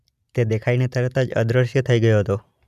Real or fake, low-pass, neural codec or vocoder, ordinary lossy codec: real; 14.4 kHz; none; none